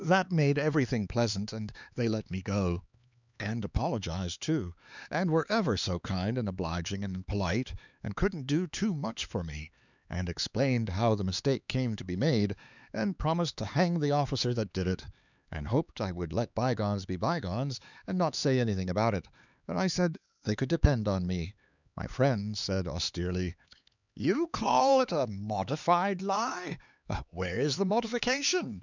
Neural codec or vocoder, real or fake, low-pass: codec, 16 kHz, 4 kbps, X-Codec, HuBERT features, trained on LibriSpeech; fake; 7.2 kHz